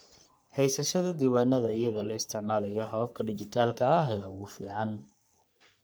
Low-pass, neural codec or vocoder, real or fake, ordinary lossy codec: none; codec, 44.1 kHz, 3.4 kbps, Pupu-Codec; fake; none